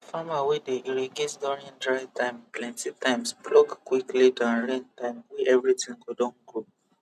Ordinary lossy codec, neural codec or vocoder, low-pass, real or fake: none; none; 14.4 kHz; real